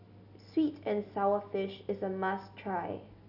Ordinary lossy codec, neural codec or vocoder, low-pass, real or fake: none; none; 5.4 kHz; real